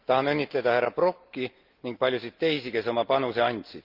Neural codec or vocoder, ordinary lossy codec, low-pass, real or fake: none; Opus, 32 kbps; 5.4 kHz; real